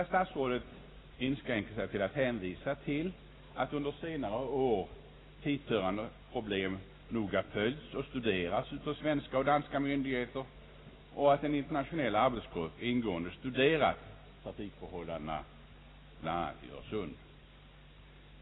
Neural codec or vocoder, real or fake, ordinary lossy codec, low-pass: none; real; AAC, 16 kbps; 7.2 kHz